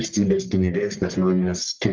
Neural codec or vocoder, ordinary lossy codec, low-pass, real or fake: codec, 44.1 kHz, 1.7 kbps, Pupu-Codec; Opus, 24 kbps; 7.2 kHz; fake